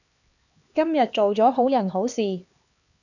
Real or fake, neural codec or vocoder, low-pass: fake; codec, 16 kHz, 2 kbps, X-Codec, HuBERT features, trained on LibriSpeech; 7.2 kHz